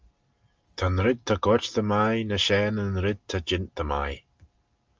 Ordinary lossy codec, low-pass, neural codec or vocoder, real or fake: Opus, 24 kbps; 7.2 kHz; none; real